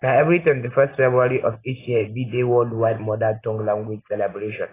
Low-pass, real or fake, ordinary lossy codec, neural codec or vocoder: 3.6 kHz; real; AAC, 16 kbps; none